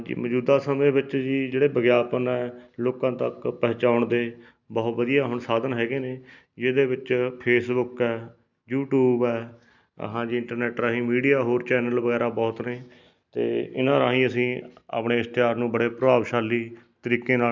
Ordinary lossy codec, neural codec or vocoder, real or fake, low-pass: none; none; real; 7.2 kHz